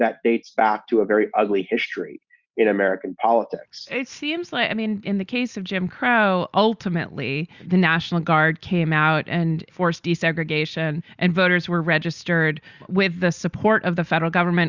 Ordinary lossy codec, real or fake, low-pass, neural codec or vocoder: Opus, 64 kbps; real; 7.2 kHz; none